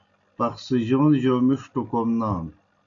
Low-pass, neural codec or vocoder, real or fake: 7.2 kHz; none; real